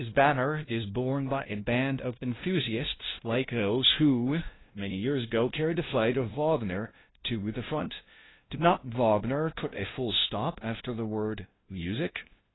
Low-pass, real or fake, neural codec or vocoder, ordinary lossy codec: 7.2 kHz; fake; codec, 16 kHz, 0.5 kbps, FunCodec, trained on LibriTTS, 25 frames a second; AAC, 16 kbps